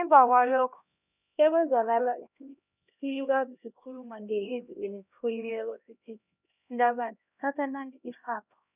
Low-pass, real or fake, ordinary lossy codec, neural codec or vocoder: 3.6 kHz; fake; none; codec, 16 kHz, 1 kbps, X-Codec, HuBERT features, trained on LibriSpeech